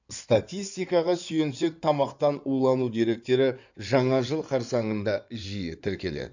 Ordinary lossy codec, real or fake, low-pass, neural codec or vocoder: none; fake; 7.2 kHz; codec, 16 kHz in and 24 kHz out, 2.2 kbps, FireRedTTS-2 codec